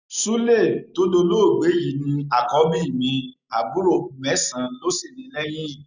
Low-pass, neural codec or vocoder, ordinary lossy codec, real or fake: 7.2 kHz; none; none; real